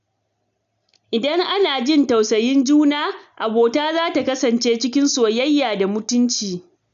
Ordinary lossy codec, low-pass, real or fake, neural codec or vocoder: none; 7.2 kHz; real; none